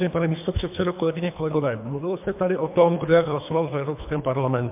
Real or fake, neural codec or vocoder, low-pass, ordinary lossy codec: fake; codec, 24 kHz, 3 kbps, HILCodec; 3.6 kHz; MP3, 32 kbps